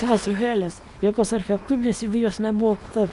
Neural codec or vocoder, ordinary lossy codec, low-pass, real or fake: codec, 24 kHz, 0.9 kbps, WavTokenizer, small release; AAC, 64 kbps; 10.8 kHz; fake